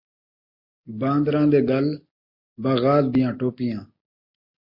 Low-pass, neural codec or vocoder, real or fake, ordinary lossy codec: 5.4 kHz; none; real; MP3, 32 kbps